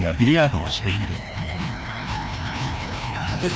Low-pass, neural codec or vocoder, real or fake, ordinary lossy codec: none; codec, 16 kHz, 1 kbps, FreqCodec, larger model; fake; none